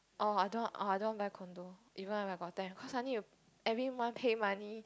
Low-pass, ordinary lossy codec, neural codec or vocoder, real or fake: none; none; none; real